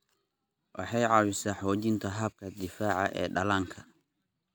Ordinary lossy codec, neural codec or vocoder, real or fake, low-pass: none; none; real; none